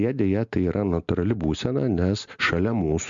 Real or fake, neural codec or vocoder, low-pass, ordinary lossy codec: real; none; 7.2 kHz; MP3, 64 kbps